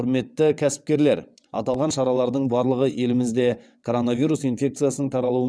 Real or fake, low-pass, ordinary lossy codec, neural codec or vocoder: fake; none; none; vocoder, 22.05 kHz, 80 mel bands, WaveNeXt